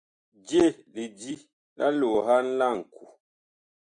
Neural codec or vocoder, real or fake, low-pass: none; real; 9.9 kHz